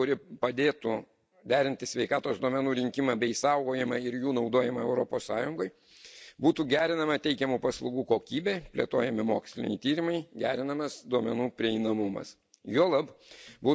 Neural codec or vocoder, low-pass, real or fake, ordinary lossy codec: none; none; real; none